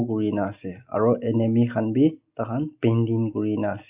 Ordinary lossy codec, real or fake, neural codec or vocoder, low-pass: Opus, 64 kbps; real; none; 3.6 kHz